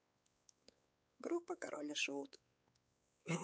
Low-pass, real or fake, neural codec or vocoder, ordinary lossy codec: none; fake; codec, 16 kHz, 4 kbps, X-Codec, WavLM features, trained on Multilingual LibriSpeech; none